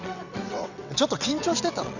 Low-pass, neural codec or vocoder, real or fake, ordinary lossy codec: 7.2 kHz; vocoder, 22.05 kHz, 80 mel bands, WaveNeXt; fake; none